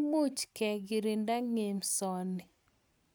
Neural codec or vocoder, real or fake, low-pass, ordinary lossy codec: none; real; none; none